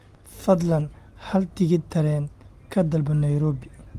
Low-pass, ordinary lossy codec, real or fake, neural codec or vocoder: 14.4 kHz; Opus, 24 kbps; real; none